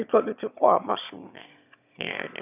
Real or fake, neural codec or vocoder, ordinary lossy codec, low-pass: fake; autoencoder, 22.05 kHz, a latent of 192 numbers a frame, VITS, trained on one speaker; none; 3.6 kHz